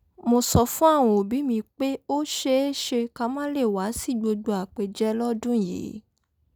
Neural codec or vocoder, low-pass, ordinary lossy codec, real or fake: none; none; none; real